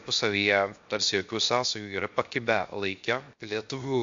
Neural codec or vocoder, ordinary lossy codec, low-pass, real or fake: codec, 16 kHz, 0.7 kbps, FocalCodec; MP3, 48 kbps; 7.2 kHz; fake